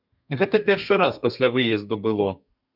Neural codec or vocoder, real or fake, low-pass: codec, 32 kHz, 1.9 kbps, SNAC; fake; 5.4 kHz